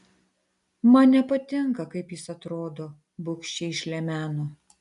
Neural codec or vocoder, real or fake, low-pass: none; real; 10.8 kHz